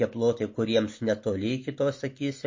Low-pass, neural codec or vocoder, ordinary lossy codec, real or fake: 7.2 kHz; none; MP3, 32 kbps; real